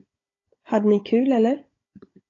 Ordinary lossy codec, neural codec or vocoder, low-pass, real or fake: AAC, 32 kbps; codec, 16 kHz, 16 kbps, FunCodec, trained on Chinese and English, 50 frames a second; 7.2 kHz; fake